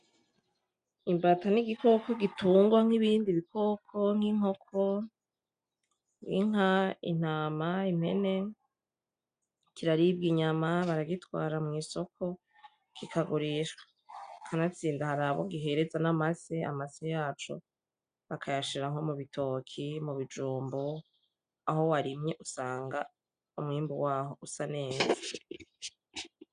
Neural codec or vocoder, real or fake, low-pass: none; real; 9.9 kHz